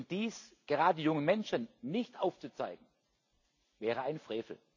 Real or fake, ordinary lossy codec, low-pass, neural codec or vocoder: real; none; 7.2 kHz; none